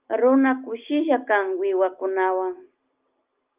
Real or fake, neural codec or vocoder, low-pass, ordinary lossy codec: real; none; 3.6 kHz; Opus, 24 kbps